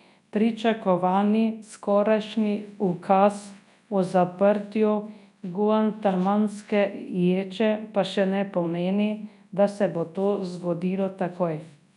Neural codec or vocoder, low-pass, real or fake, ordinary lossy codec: codec, 24 kHz, 0.9 kbps, WavTokenizer, large speech release; 10.8 kHz; fake; none